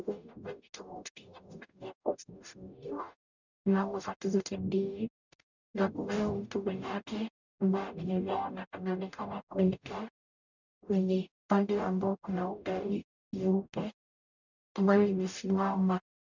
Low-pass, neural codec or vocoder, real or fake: 7.2 kHz; codec, 44.1 kHz, 0.9 kbps, DAC; fake